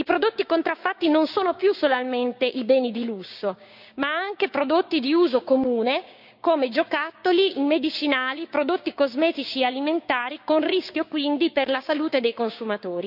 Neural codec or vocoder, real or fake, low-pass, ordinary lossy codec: codec, 16 kHz, 6 kbps, DAC; fake; 5.4 kHz; none